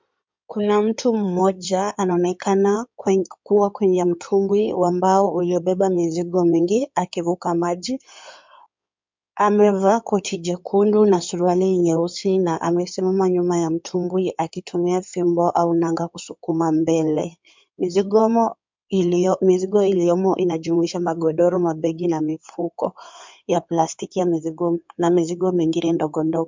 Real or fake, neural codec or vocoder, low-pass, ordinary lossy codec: fake; codec, 16 kHz in and 24 kHz out, 2.2 kbps, FireRedTTS-2 codec; 7.2 kHz; MP3, 64 kbps